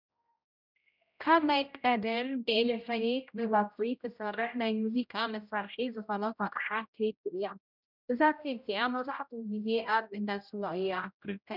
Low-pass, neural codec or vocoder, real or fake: 5.4 kHz; codec, 16 kHz, 0.5 kbps, X-Codec, HuBERT features, trained on general audio; fake